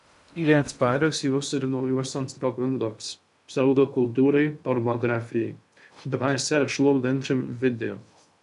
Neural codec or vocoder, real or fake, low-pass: codec, 16 kHz in and 24 kHz out, 0.6 kbps, FocalCodec, streaming, 2048 codes; fake; 10.8 kHz